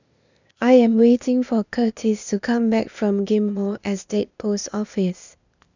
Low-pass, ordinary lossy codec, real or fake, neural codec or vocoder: 7.2 kHz; none; fake; codec, 16 kHz, 0.8 kbps, ZipCodec